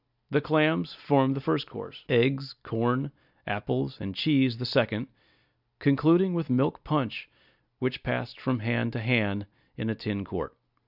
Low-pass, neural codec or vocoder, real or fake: 5.4 kHz; none; real